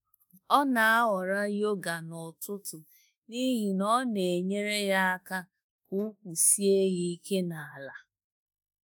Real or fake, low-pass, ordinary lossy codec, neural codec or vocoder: fake; none; none; autoencoder, 48 kHz, 32 numbers a frame, DAC-VAE, trained on Japanese speech